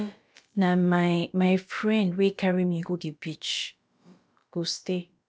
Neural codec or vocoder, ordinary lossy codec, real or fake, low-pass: codec, 16 kHz, about 1 kbps, DyCAST, with the encoder's durations; none; fake; none